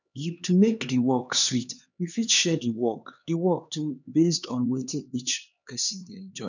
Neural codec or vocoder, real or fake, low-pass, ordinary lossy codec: codec, 16 kHz, 2 kbps, X-Codec, HuBERT features, trained on LibriSpeech; fake; 7.2 kHz; none